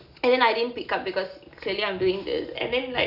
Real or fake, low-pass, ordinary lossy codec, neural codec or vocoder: real; 5.4 kHz; none; none